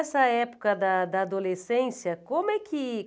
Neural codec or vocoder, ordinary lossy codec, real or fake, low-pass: none; none; real; none